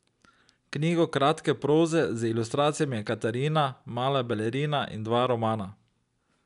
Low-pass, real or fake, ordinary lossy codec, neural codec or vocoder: 10.8 kHz; real; none; none